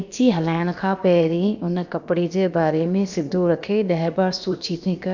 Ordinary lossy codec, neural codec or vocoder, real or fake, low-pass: none; codec, 16 kHz, about 1 kbps, DyCAST, with the encoder's durations; fake; 7.2 kHz